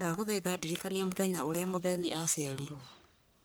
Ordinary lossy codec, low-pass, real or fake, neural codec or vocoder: none; none; fake; codec, 44.1 kHz, 1.7 kbps, Pupu-Codec